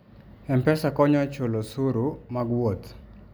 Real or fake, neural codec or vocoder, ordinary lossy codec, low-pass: real; none; none; none